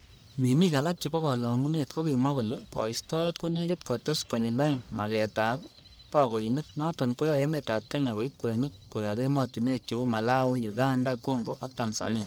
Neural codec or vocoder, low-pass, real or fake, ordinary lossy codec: codec, 44.1 kHz, 1.7 kbps, Pupu-Codec; none; fake; none